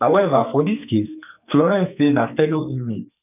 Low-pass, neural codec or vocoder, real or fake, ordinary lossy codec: 3.6 kHz; codec, 44.1 kHz, 2.6 kbps, SNAC; fake; none